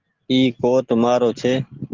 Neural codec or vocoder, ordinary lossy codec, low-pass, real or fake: none; Opus, 16 kbps; 7.2 kHz; real